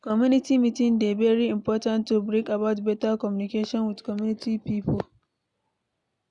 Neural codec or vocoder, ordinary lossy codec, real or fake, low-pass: none; none; real; 10.8 kHz